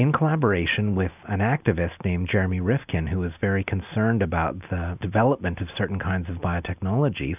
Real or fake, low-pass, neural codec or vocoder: real; 3.6 kHz; none